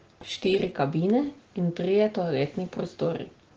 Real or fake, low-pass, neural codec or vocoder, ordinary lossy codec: real; 7.2 kHz; none; Opus, 16 kbps